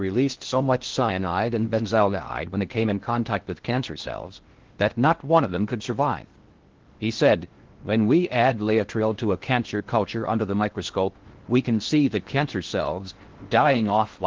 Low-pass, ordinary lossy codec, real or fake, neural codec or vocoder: 7.2 kHz; Opus, 16 kbps; fake; codec, 16 kHz in and 24 kHz out, 0.6 kbps, FocalCodec, streaming, 2048 codes